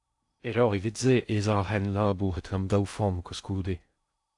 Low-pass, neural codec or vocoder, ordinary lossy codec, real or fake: 10.8 kHz; codec, 16 kHz in and 24 kHz out, 0.6 kbps, FocalCodec, streaming, 2048 codes; AAC, 64 kbps; fake